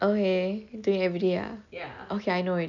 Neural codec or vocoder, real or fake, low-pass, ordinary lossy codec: none; real; 7.2 kHz; none